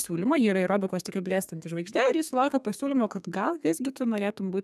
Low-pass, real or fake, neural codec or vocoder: 14.4 kHz; fake; codec, 32 kHz, 1.9 kbps, SNAC